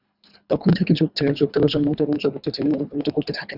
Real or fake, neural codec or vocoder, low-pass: fake; codec, 24 kHz, 3 kbps, HILCodec; 5.4 kHz